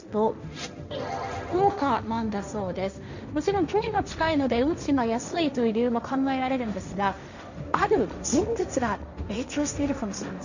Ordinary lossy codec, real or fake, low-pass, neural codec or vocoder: none; fake; 7.2 kHz; codec, 16 kHz, 1.1 kbps, Voila-Tokenizer